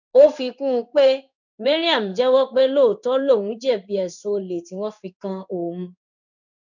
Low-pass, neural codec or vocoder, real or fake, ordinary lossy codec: 7.2 kHz; codec, 16 kHz in and 24 kHz out, 1 kbps, XY-Tokenizer; fake; none